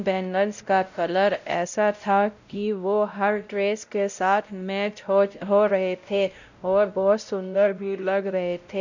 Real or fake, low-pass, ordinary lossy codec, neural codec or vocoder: fake; 7.2 kHz; none; codec, 16 kHz, 0.5 kbps, X-Codec, WavLM features, trained on Multilingual LibriSpeech